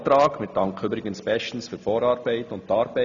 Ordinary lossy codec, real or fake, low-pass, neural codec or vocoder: none; real; 7.2 kHz; none